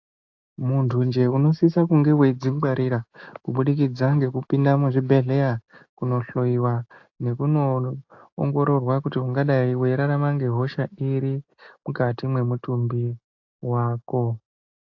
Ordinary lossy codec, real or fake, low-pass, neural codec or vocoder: AAC, 48 kbps; real; 7.2 kHz; none